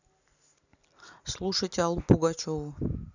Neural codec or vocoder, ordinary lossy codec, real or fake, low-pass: none; none; real; 7.2 kHz